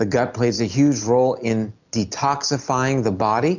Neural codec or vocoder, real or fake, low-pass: none; real; 7.2 kHz